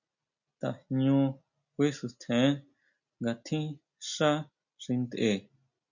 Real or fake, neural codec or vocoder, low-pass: real; none; 7.2 kHz